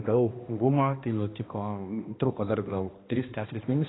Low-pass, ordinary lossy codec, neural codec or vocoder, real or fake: 7.2 kHz; AAC, 16 kbps; codec, 16 kHz, 1 kbps, X-Codec, HuBERT features, trained on balanced general audio; fake